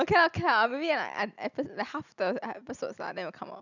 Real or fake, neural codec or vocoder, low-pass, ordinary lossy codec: real; none; 7.2 kHz; none